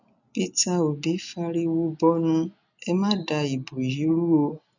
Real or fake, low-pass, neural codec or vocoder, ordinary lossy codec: real; 7.2 kHz; none; none